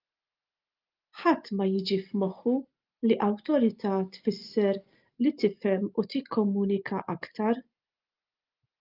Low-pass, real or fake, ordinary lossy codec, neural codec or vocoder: 5.4 kHz; real; Opus, 24 kbps; none